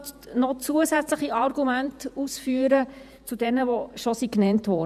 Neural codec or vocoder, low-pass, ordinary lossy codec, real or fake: vocoder, 48 kHz, 128 mel bands, Vocos; 14.4 kHz; none; fake